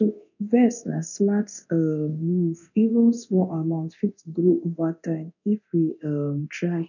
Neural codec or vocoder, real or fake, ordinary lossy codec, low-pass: codec, 24 kHz, 0.9 kbps, DualCodec; fake; none; 7.2 kHz